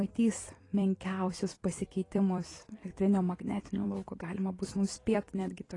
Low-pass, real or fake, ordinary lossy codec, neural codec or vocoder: 10.8 kHz; fake; AAC, 32 kbps; vocoder, 44.1 kHz, 128 mel bands every 256 samples, BigVGAN v2